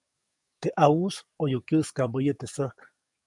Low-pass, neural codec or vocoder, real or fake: 10.8 kHz; codec, 44.1 kHz, 7.8 kbps, DAC; fake